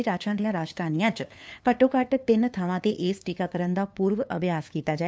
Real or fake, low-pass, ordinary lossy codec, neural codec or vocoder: fake; none; none; codec, 16 kHz, 2 kbps, FunCodec, trained on LibriTTS, 25 frames a second